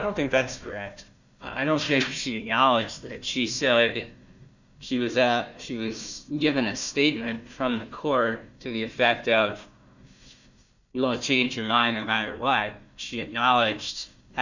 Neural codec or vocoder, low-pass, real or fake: codec, 16 kHz, 1 kbps, FunCodec, trained on Chinese and English, 50 frames a second; 7.2 kHz; fake